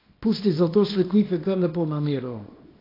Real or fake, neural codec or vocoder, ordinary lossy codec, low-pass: fake; codec, 24 kHz, 0.9 kbps, WavTokenizer, small release; AAC, 24 kbps; 5.4 kHz